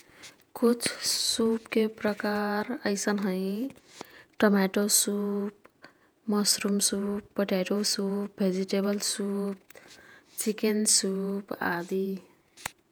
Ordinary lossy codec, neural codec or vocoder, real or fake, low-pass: none; vocoder, 48 kHz, 128 mel bands, Vocos; fake; none